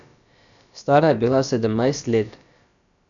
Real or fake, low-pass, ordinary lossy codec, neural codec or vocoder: fake; 7.2 kHz; none; codec, 16 kHz, about 1 kbps, DyCAST, with the encoder's durations